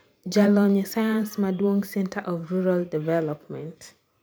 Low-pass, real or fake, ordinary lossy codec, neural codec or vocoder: none; fake; none; vocoder, 44.1 kHz, 128 mel bands every 512 samples, BigVGAN v2